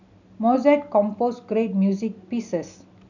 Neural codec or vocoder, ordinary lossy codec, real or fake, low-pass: none; none; real; 7.2 kHz